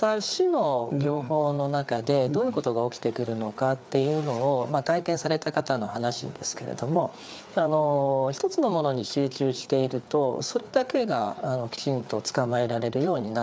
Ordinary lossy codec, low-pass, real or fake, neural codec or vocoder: none; none; fake; codec, 16 kHz, 4 kbps, FreqCodec, larger model